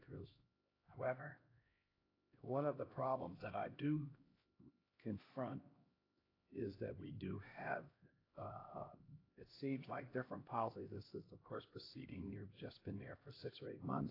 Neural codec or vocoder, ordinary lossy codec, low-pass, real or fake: codec, 16 kHz, 1 kbps, X-Codec, HuBERT features, trained on LibriSpeech; AAC, 24 kbps; 5.4 kHz; fake